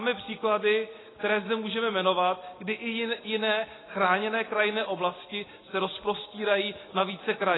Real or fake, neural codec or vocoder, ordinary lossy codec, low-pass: real; none; AAC, 16 kbps; 7.2 kHz